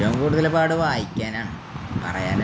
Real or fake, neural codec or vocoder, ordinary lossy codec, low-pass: real; none; none; none